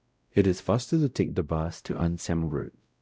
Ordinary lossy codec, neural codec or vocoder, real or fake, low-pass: none; codec, 16 kHz, 0.5 kbps, X-Codec, WavLM features, trained on Multilingual LibriSpeech; fake; none